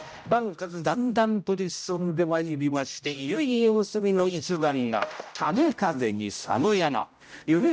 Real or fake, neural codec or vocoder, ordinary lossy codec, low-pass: fake; codec, 16 kHz, 0.5 kbps, X-Codec, HuBERT features, trained on general audio; none; none